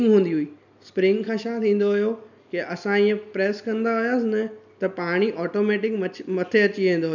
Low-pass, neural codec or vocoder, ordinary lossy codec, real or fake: 7.2 kHz; none; none; real